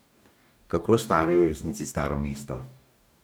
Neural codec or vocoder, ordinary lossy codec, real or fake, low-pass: codec, 44.1 kHz, 2.6 kbps, DAC; none; fake; none